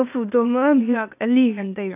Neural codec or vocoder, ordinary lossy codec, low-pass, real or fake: codec, 16 kHz, 0.8 kbps, ZipCodec; none; 3.6 kHz; fake